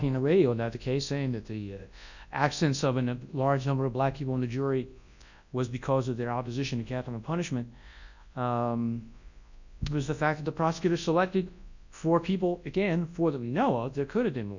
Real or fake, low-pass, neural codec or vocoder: fake; 7.2 kHz; codec, 24 kHz, 0.9 kbps, WavTokenizer, large speech release